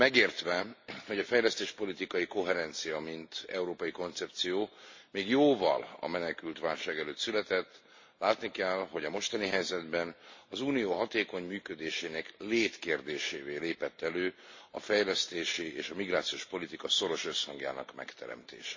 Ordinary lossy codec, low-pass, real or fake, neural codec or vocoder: MP3, 32 kbps; 7.2 kHz; real; none